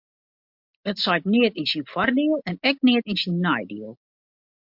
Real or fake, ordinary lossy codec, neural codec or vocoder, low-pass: real; MP3, 48 kbps; none; 5.4 kHz